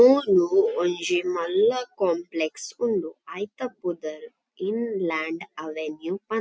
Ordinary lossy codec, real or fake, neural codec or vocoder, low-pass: none; real; none; none